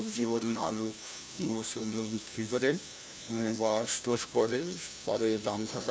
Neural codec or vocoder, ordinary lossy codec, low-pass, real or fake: codec, 16 kHz, 1 kbps, FunCodec, trained on LibriTTS, 50 frames a second; none; none; fake